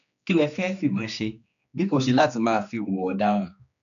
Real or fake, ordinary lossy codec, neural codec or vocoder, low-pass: fake; none; codec, 16 kHz, 2 kbps, X-Codec, HuBERT features, trained on general audio; 7.2 kHz